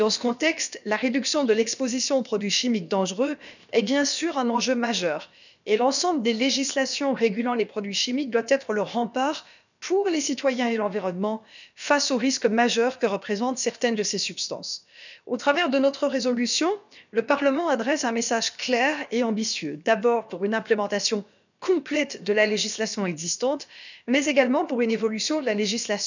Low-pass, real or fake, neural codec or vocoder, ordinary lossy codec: 7.2 kHz; fake; codec, 16 kHz, about 1 kbps, DyCAST, with the encoder's durations; none